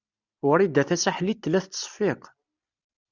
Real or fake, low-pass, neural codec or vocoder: real; 7.2 kHz; none